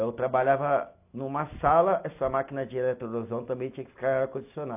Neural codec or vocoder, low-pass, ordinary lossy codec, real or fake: none; 3.6 kHz; AAC, 32 kbps; real